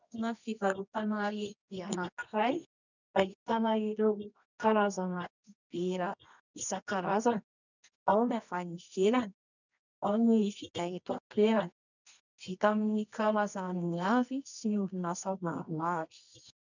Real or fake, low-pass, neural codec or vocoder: fake; 7.2 kHz; codec, 24 kHz, 0.9 kbps, WavTokenizer, medium music audio release